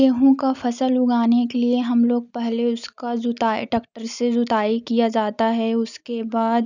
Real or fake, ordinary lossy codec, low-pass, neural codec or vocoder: real; none; 7.2 kHz; none